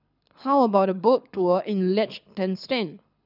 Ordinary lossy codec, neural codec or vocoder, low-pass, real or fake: none; codec, 24 kHz, 6 kbps, HILCodec; 5.4 kHz; fake